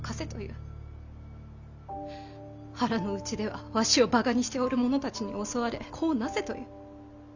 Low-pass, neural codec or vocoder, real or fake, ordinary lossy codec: 7.2 kHz; none; real; none